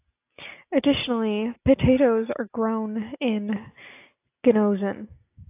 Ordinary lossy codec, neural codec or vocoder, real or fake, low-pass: AAC, 24 kbps; none; real; 3.6 kHz